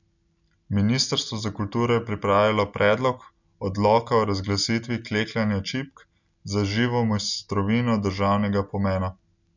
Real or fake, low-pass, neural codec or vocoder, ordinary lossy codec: real; 7.2 kHz; none; none